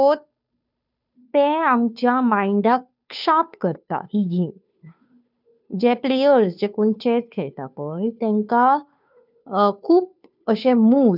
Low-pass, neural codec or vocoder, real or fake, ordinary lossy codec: 5.4 kHz; codec, 16 kHz, 2 kbps, FunCodec, trained on Chinese and English, 25 frames a second; fake; none